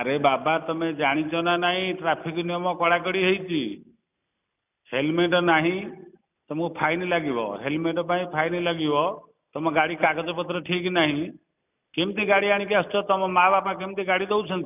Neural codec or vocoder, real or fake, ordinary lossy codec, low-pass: none; real; none; 3.6 kHz